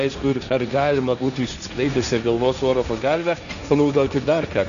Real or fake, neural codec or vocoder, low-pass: fake; codec, 16 kHz, 1.1 kbps, Voila-Tokenizer; 7.2 kHz